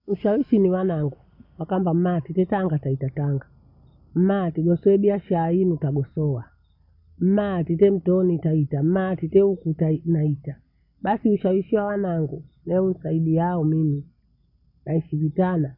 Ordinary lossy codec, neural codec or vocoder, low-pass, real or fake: none; none; 5.4 kHz; real